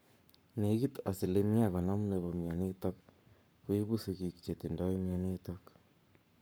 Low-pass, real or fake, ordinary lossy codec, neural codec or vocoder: none; fake; none; codec, 44.1 kHz, 7.8 kbps, Pupu-Codec